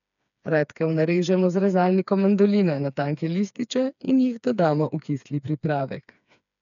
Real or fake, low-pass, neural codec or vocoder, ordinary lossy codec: fake; 7.2 kHz; codec, 16 kHz, 4 kbps, FreqCodec, smaller model; none